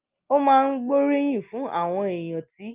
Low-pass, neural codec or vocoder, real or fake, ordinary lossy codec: 3.6 kHz; none; real; Opus, 32 kbps